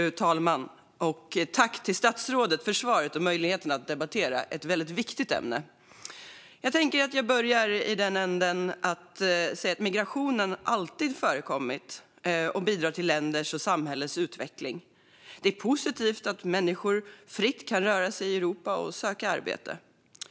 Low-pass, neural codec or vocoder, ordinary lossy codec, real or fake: none; none; none; real